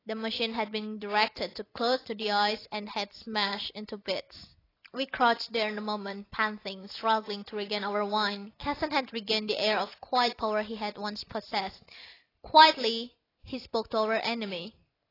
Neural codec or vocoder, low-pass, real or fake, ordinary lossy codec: none; 5.4 kHz; real; AAC, 24 kbps